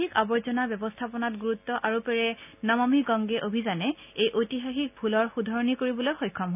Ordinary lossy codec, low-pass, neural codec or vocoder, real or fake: none; 3.6 kHz; none; real